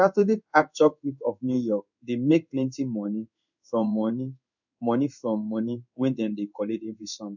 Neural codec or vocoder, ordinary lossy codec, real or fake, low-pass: codec, 16 kHz in and 24 kHz out, 1 kbps, XY-Tokenizer; none; fake; 7.2 kHz